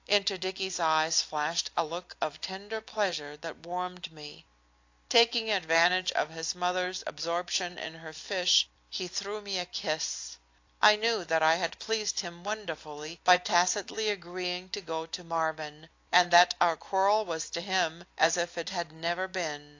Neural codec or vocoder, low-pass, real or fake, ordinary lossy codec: none; 7.2 kHz; real; AAC, 48 kbps